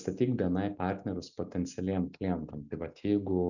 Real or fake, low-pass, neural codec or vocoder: real; 7.2 kHz; none